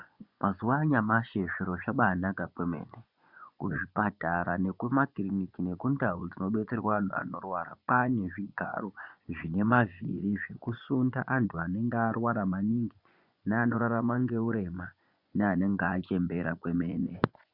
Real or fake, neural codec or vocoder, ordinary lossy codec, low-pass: fake; vocoder, 24 kHz, 100 mel bands, Vocos; Opus, 64 kbps; 5.4 kHz